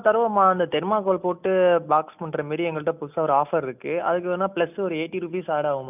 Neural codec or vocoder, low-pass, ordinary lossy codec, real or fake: none; 3.6 kHz; none; real